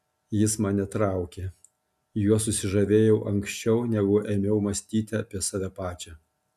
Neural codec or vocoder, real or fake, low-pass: none; real; 14.4 kHz